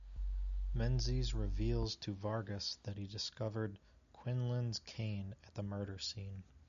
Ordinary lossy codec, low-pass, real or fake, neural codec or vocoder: AAC, 96 kbps; 7.2 kHz; real; none